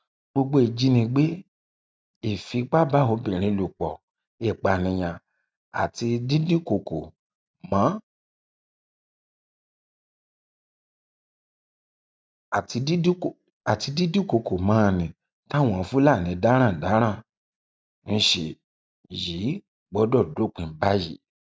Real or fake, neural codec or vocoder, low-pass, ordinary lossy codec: real; none; none; none